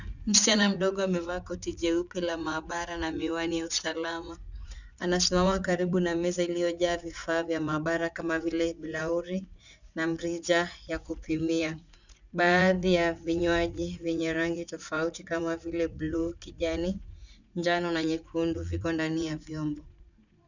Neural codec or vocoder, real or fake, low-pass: vocoder, 44.1 kHz, 80 mel bands, Vocos; fake; 7.2 kHz